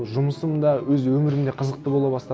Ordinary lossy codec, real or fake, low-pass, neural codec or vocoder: none; real; none; none